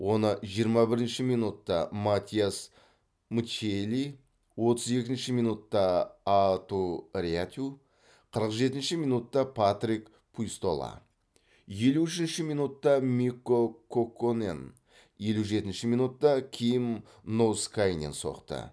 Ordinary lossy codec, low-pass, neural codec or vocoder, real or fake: none; none; none; real